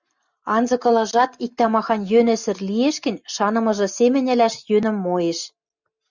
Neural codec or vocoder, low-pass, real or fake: none; 7.2 kHz; real